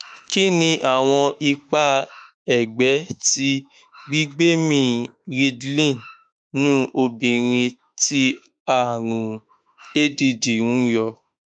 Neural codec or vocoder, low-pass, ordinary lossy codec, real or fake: autoencoder, 48 kHz, 32 numbers a frame, DAC-VAE, trained on Japanese speech; 9.9 kHz; none; fake